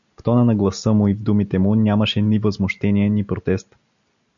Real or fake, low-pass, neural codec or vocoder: real; 7.2 kHz; none